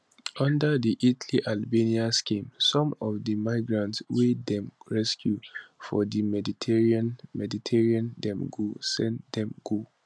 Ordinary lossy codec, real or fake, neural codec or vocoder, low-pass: none; real; none; none